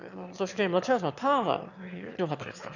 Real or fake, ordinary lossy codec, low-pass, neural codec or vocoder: fake; none; 7.2 kHz; autoencoder, 22.05 kHz, a latent of 192 numbers a frame, VITS, trained on one speaker